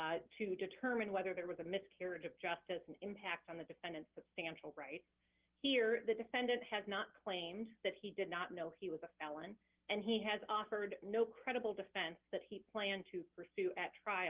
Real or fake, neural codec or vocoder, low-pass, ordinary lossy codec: real; none; 3.6 kHz; Opus, 16 kbps